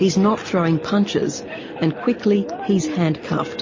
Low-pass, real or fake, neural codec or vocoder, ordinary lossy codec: 7.2 kHz; real; none; MP3, 32 kbps